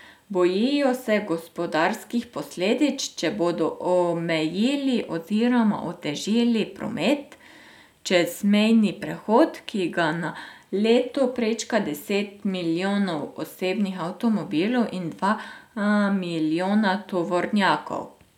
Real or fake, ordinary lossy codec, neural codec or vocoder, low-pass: real; none; none; 19.8 kHz